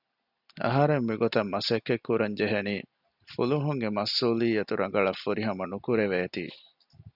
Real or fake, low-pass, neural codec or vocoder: real; 5.4 kHz; none